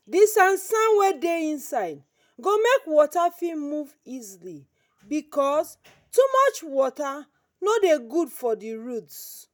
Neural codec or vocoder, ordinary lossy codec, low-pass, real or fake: none; none; none; real